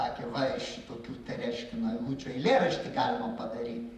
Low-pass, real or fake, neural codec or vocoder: 14.4 kHz; fake; vocoder, 44.1 kHz, 128 mel bands, Pupu-Vocoder